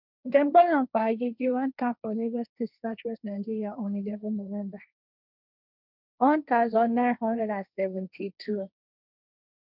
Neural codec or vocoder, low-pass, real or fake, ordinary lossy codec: codec, 16 kHz, 1.1 kbps, Voila-Tokenizer; 5.4 kHz; fake; none